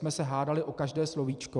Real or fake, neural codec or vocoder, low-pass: fake; vocoder, 44.1 kHz, 128 mel bands every 256 samples, BigVGAN v2; 10.8 kHz